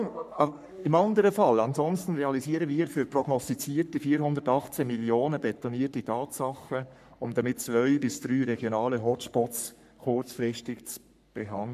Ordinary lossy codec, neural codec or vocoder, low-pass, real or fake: none; codec, 44.1 kHz, 3.4 kbps, Pupu-Codec; 14.4 kHz; fake